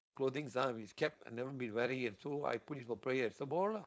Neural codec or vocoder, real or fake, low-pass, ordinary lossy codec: codec, 16 kHz, 4.8 kbps, FACodec; fake; none; none